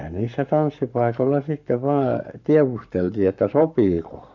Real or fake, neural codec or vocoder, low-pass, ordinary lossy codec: fake; codec, 44.1 kHz, 7.8 kbps, Pupu-Codec; 7.2 kHz; none